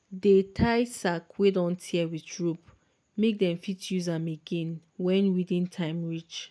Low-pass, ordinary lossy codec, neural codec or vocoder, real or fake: none; none; none; real